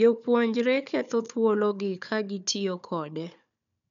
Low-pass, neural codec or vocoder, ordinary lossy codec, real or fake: 7.2 kHz; codec, 16 kHz, 4 kbps, FunCodec, trained on Chinese and English, 50 frames a second; none; fake